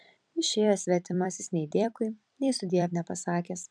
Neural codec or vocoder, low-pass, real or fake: vocoder, 44.1 kHz, 128 mel bands every 256 samples, BigVGAN v2; 9.9 kHz; fake